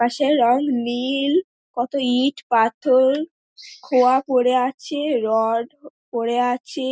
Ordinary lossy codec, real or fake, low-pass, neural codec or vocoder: none; real; none; none